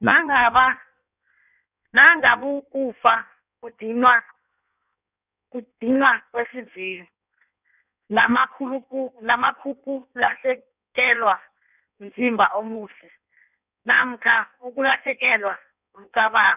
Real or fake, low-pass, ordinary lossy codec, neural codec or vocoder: fake; 3.6 kHz; none; codec, 16 kHz in and 24 kHz out, 1.1 kbps, FireRedTTS-2 codec